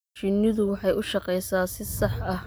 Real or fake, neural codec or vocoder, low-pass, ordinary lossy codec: real; none; none; none